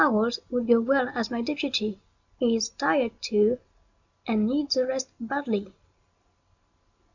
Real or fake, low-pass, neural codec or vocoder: real; 7.2 kHz; none